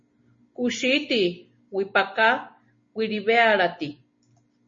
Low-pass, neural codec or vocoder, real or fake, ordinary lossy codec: 7.2 kHz; none; real; MP3, 32 kbps